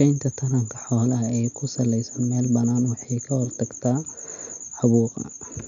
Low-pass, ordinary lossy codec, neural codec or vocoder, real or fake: 7.2 kHz; none; none; real